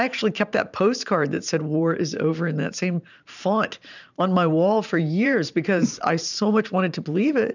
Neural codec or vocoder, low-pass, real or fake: vocoder, 44.1 kHz, 128 mel bands every 512 samples, BigVGAN v2; 7.2 kHz; fake